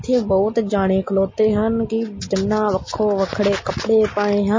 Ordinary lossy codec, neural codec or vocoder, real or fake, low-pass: MP3, 32 kbps; none; real; 7.2 kHz